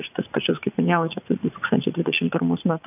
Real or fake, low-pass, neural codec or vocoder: fake; 3.6 kHz; vocoder, 44.1 kHz, 80 mel bands, Vocos